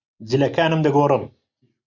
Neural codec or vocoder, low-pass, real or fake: none; 7.2 kHz; real